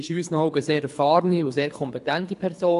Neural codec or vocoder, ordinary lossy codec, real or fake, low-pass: codec, 24 kHz, 3 kbps, HILCodec; AAC, 64 kbps; fake; 10.8 kHz